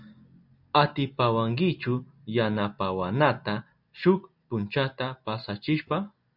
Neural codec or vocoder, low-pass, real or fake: none; 5.4 kHz; real